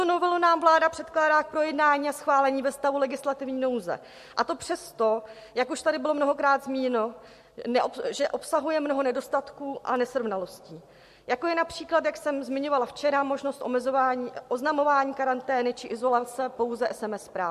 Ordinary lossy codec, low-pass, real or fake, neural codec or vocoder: MP3, 64 kbps; 14.4 kHz; real; none